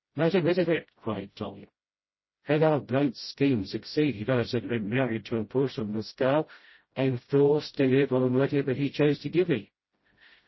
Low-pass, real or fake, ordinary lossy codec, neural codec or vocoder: 7.2 kHz; fake; MP3, 24 kbps; codec, 16 kHz, 0.5 kbps, FreqCodec, smaller model